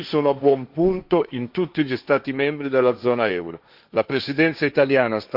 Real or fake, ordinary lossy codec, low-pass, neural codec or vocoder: fake; Opus, 64 kbps; 5.4 kHz; codec, 16 kHz, 1.1 kbps, Voila-Tokenizer